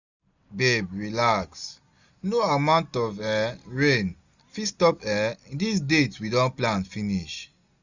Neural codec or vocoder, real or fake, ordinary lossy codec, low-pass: none; real; none; 7.2 kHz